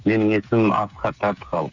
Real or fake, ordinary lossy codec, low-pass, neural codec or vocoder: fake; none; 7.2 kHz; codec, 16 kHz, 8 kbps, FreqCodec, smaller model